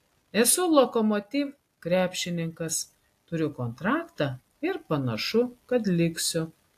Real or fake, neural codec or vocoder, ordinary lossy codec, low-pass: real; none; AAC, 64 kbps; 14.4 kHz